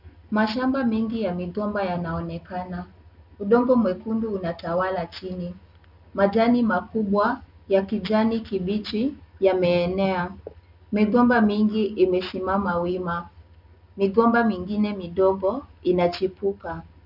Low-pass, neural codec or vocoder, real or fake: 5.4 kHz; none; real